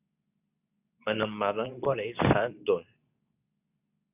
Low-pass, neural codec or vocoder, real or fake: 3.6 kHz; codec, 24 kHz, 0.9 kbps, WavTokenizer, medium speech release version 2; fake